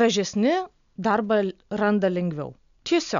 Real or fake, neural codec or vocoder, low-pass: real; none; 7.2 kHz